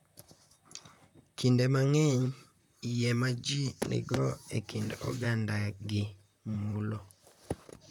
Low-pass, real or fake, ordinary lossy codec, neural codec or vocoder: 19.8 kHz; fake; none; vocoder, 44.1 kHz, 128 mel bands, Pupu-Vocoder